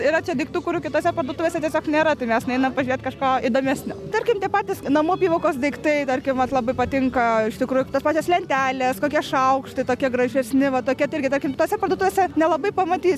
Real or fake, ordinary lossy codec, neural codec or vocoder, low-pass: real; MP3, 96 kbps; none; 14.4 kHz